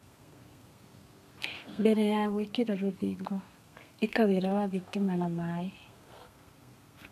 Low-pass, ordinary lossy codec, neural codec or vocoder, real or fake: 14.4 kHz; none; codec, 32 kHz, 1.9 kbps, SNAC; fake